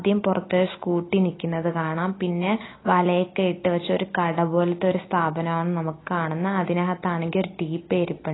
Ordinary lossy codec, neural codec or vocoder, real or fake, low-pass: AAC, 16 kbps; none; real; 7.2 kHz